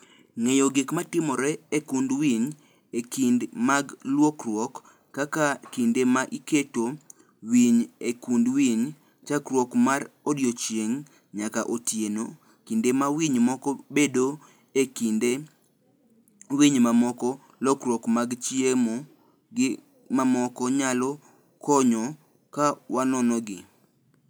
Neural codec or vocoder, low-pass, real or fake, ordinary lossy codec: none; none; real; none